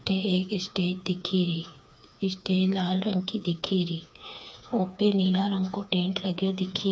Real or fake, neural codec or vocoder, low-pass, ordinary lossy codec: fake; codec, 16 kHz, 4 kbps, FreqCodec, smaller model; none; none